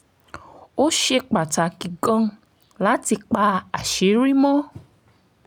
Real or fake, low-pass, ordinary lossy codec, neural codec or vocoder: real; 19.8 kHz; none; none